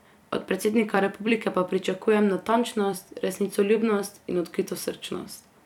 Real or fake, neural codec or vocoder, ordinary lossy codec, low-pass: fake; vocoder, 44.1 kHz, 128 mel bands every 256 samples, BigVGAN v2; none; 19.8 kHz